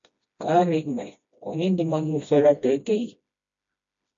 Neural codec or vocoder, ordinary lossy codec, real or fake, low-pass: codec, 16 kHz, 1 kbps, FreqCodec, smaller model; MP3, 48 kbps; fake; 7.2 kHz